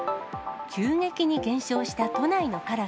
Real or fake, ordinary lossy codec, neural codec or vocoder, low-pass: real; none; none; none